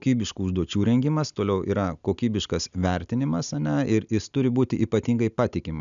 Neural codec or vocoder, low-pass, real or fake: none; 7.2 kHz; real